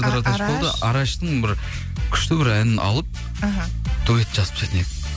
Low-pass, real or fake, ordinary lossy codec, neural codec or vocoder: none; real; none; none